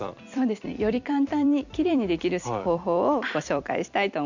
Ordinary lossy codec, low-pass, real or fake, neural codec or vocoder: none; 7.2 kHz; fake; vocoder, 44.1 kHz, 128 mel bands every 256 samples, BigVGAN v2